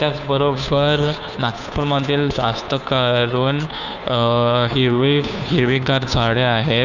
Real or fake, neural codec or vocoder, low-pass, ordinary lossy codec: fake; codec, 16 kHz, 2 kbps, FunCodec, trained on Chinese and English, 25 frames a second; 7.2 kHz; none